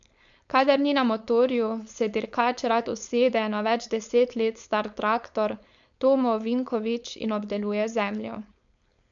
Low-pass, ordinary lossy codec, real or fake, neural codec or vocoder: 7.2 kHz; none; fake; codec, 16 kHz, 4.8 kbps, FACodec